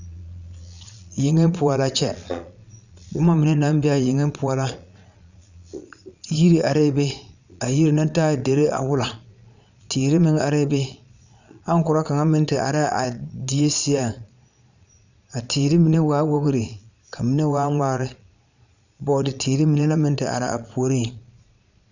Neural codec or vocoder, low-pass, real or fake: vocoder, 22.05 kHz, 80 mel bands, Vocos; 7.2 kHz; fake